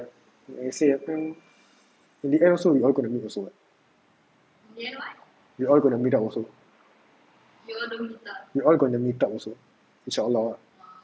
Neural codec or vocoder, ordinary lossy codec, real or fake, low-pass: none; none; real; none